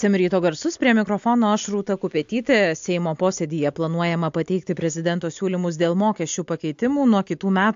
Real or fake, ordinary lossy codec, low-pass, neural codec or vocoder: real; AAC, 64 kbps; 7.2 kHz; none